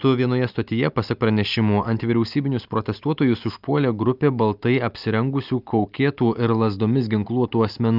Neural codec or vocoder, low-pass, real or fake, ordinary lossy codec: none; 5.4 kHz; real; Opus, 24 kbps